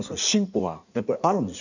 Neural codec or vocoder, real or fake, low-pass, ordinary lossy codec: codec, 16 kHz in and 24 kHz out, 1.1 kbps, FireRedTTS-2 codec; fake; 7.2 kHz; none